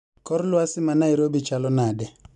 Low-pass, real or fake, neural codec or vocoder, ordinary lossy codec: 10.8 kHz; real; none; none